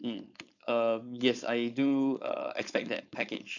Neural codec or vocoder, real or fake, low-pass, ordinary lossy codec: codec, 16 kHz, 4.8 kbps, FACodec; fake; 7.2 kHz; none